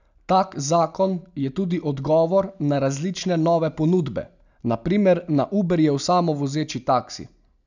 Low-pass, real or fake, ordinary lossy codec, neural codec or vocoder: 7.2 kHz; real; none; none